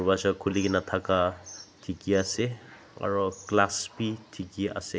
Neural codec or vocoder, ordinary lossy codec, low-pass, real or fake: none; none; none; real